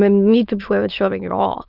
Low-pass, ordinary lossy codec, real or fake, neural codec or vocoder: 5.4 kHz; Opus, 24 kbps; fake; autoencoder, 22.05 kHz, a latent of 192 numbers a frame, VITS, trained on many speakers